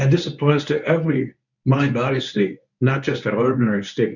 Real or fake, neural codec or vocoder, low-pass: fake; codec, 24 kHz, 0.9 kbps, WavTokenizer, medium speech release version 1; 7.2 kHz